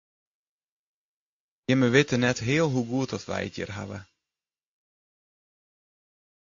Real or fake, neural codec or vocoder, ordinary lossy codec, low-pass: real; none; AAC, 48 kbps; 7.2 kHz